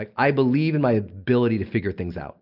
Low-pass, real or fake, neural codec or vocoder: 5.4 kHz; real; none